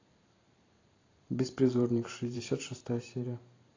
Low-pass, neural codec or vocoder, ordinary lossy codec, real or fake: 7.2 kHz; vocoder, 44.1 kHz, 128 mel bands every 512 samples, BigVGAN v2; AAC, 32 kbps; fake